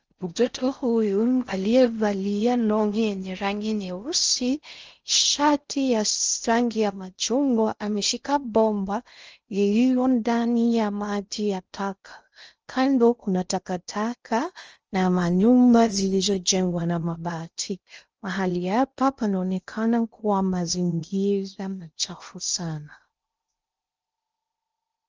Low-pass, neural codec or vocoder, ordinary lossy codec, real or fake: 7.2 kHz; codec, 16 kHz in and 24 kHz out, 0.6 kbps, FocalCodec, streaming, 4096 codes; Opus, 24 kbps; fake